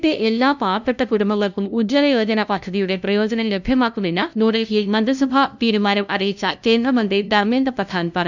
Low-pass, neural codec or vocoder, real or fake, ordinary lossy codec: 7.2 kHz; codec, 16 kHz, 0.5 kbps, FunCodec, trained on LibriTTS, 25 frames a second; fake; none